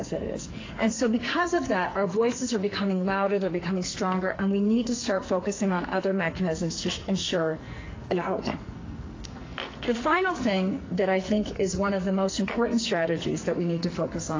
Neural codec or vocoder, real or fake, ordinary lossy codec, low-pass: codec, 44.1 kHz, 2.6 kbps, SNAC; fake; AAC, 32 kbps; 7.2 kHz